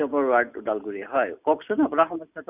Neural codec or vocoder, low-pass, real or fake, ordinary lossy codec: none; 3.6 kHz; real; none